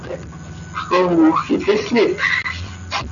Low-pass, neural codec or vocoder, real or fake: 7.2 kHz; none; real